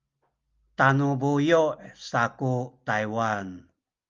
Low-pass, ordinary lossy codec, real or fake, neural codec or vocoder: 7.2 kHz; Opus, 32 kbps; real; none